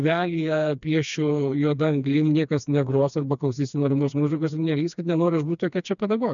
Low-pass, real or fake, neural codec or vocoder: 7.2 kHz; fake; codec, 16 kHz, 2 kbps, FreqCodec, smaller model